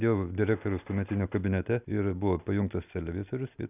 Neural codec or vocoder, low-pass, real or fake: none; 3.6 kHz; real